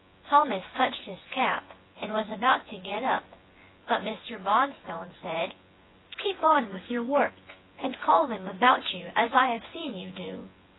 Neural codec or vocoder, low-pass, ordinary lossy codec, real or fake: vocoder, 24 kHz, 100 mel bands, Vocos; 7.2 kHz; AAC, 16 kbps; fake